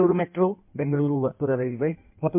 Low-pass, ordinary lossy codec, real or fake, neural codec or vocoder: 3.6 kHz; MP3, 32 kbps; fake; codec, 16 kHz in and 24 kHz out, 1.1 kbps, FireRedTTS-2 codec